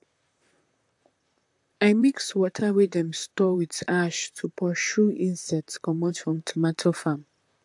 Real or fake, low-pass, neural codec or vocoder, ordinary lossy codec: fake; 10.8 kHz; vocoder, 44.1 kHz, 128 mel bands, Pupu-Vocoder; AAC, 64 kbps